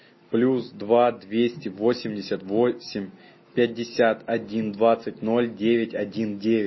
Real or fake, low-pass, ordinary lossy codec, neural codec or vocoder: real; 7.2 kHz; MP3, 24 kbps; none